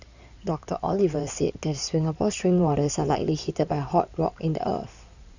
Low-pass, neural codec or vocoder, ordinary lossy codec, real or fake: 7.2 kHz; codec, 16 kHz in and 24 kHz out, 2.2 kbps, FireRedTTS-2 codec; none; fake